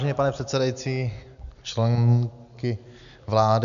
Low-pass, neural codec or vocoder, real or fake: 7.2 kHz; none; real